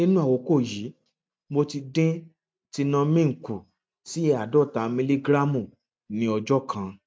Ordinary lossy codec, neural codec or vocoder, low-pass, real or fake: none; none; none; real